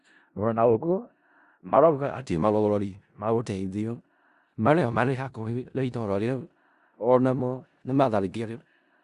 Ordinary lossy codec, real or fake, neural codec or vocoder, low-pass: Opus, 64 kbps; fake; codec, 16 kHz in and 24 kHz out, 0.4 kbps, LongCat-Audio-Codec, four codebook decoder; 10.8 kHz